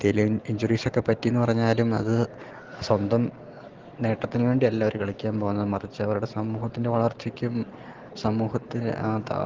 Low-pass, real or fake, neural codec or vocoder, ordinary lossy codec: 7.2 kHz; real; none; Opus, 16 kbps